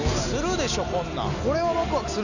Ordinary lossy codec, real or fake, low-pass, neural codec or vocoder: none; real; 7.2 kHz; none